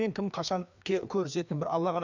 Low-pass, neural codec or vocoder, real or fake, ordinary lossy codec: 7.2 kHz; codec, 16 kHz, 2 kbps, FreqCodec, larger model; fake; none